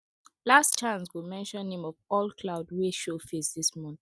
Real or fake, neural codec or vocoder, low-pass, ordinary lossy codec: real; none; none; none